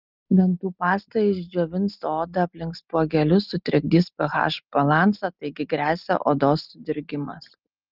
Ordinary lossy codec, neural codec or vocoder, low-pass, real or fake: Opus, 24 kbps; none; 5.4 kHz; real